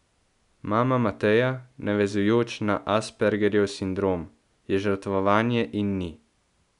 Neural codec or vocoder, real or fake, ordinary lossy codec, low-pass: none; real; none; 10.8 kHz